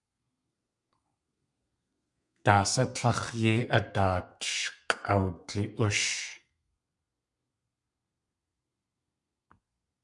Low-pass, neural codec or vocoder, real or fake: 10.8 kHz; codec, 32 kHz, 1.9 kbps, SNAC; fake